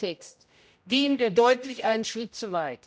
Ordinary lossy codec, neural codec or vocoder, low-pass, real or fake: none; codec, 16 kHz, 0.5 kbps, X-Codec, HuBERT features, trained on general audio; none; fake